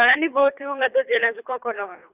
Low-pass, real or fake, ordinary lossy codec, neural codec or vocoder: 3.6 kHz; fake; none; codec, 24 kHz, 3 kbps, HILCodec